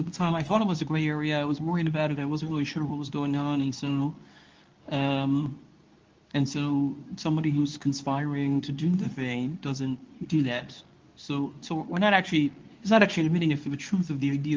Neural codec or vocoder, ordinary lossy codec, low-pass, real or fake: codec, 24 kHz, 0.9 kbps, WavTokenizer, medium speech release version 2; Opus, 24 kbps; 7.2 kHz; fake